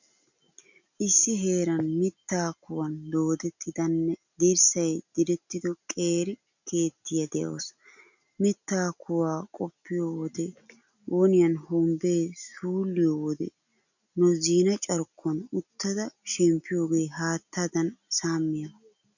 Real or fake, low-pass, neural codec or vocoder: real; 7.2 kHz; none